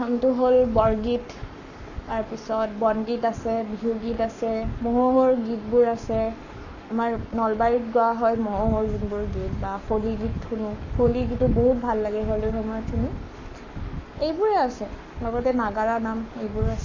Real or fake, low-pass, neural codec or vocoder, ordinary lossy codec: fake; 7.2 kHz; codec, 44.1 kHz, 7.8 kbps, Pupu-Codec; none